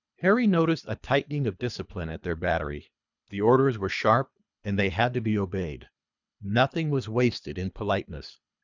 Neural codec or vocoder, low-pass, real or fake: codec, 24 kHz, 3 kbps, HILCodec; 7.2 kHz; fake